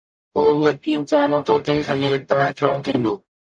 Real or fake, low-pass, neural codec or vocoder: fake; 9.9 kHz; codec, 44.1 kHz, 0.9 kbps, DAC